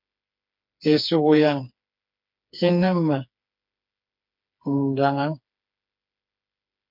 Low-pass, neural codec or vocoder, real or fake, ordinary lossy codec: 5.4 kHz; codec, 16 kHz, 4 kbps, FreqCodec, smaller model; fake; MP3, 48 kbps